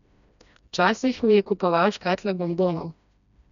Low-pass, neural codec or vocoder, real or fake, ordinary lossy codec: 7.2 kHz; codec, 16 kHz, 1 kbps, FreqCodec, smaller model; fake; none